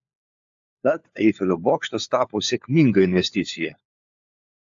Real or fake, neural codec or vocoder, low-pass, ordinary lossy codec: fake; codec, 16 kHz, 4 kbps, FunCodec, trained on LibriTTS, 50 frames a second; 7.2 kHz; AAC, 64 kbps